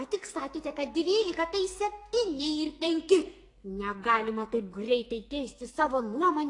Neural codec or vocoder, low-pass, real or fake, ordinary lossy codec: codec, 44.1 kHz, 2.6 kbps, SNAC; 10.8 kHz; fake; AAC, 48 kbps